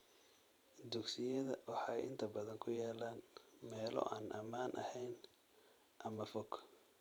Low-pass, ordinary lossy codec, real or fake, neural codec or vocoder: none; none; fake; vocoder, 44.1 kHz, 128 mel bands every 512 samples, BigVGAN v2